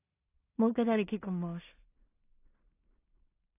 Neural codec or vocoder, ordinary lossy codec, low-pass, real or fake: codec, 16 kHz in and 24 kHz out, 0.4 kbps, LongCat-Audio-Codec, two codebook decoder; AAC, 24 kbps; 3.6 kHz; fake